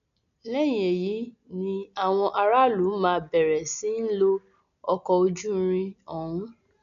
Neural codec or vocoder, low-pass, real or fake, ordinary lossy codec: none; 7.2 kHz; real; none